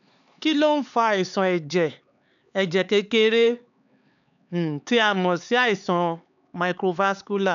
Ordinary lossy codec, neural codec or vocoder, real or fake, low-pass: MP3, 96 kbps; codec, 16 kHz, 4 kbps, X-Codec, HuBERT features, trained on LibriSpeech; fake; 7.2 kHz